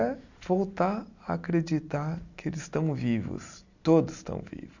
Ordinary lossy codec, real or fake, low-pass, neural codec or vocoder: none; real; 7.2 kHz; none